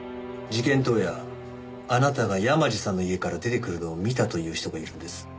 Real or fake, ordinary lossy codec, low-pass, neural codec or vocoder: real; none; none; none